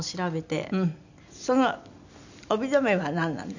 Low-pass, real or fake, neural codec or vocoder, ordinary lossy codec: 7.2 kHz; real; none; none